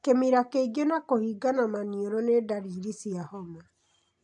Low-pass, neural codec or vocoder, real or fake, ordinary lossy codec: 10.8 kHz; none; real; AAC, 64 kbps